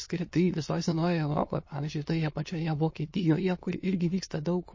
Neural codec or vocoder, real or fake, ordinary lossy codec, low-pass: autoencoder, 22.05 kHz, a latent of 192 numbers a frame, VITS, trained on many speakers; fake; MP3, 32 kbps; 7.2 kHz